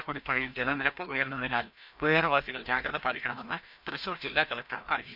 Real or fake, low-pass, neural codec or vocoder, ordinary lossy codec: fake; 5.4 kHz; codec, 16 kHz, 1 kbps, FreqCodec, larger model; none